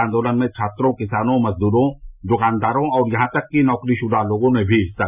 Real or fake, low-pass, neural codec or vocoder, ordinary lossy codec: real; 3.6 kHz; none; none